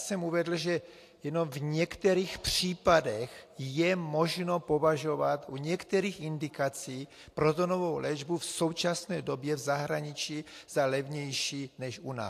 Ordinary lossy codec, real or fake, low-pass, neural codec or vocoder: AAC, 64 kbps; real; 14.4 kHz; none